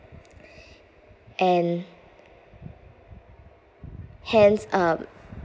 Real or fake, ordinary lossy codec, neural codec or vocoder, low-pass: real; none; none; none